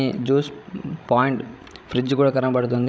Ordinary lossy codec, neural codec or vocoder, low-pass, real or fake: none; codec, 16 kHz, 16 kbps, FreqCodec, larger model; none; fake